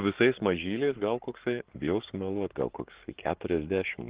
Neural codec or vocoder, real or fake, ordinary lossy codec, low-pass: codec, 44.1 kHz, 7.8 kbps, Pupu-Codec; fake; Opus, 16 kbps; 3.6 kHz